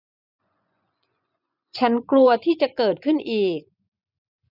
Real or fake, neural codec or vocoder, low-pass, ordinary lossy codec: fake; vocoder, 24 kHz, 100 mel bands, Vocos; 5.4 kHz; none